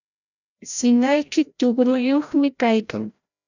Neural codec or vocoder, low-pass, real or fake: codec, 16 kHz, 0.5 kbps, FreqCodec, larger model; 7.2 kHz; fake